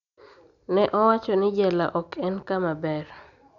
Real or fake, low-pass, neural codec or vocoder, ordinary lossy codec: real; 7.2 kHz; none; none